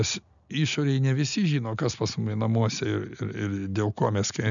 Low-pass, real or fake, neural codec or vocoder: 7.2 kHz; real; none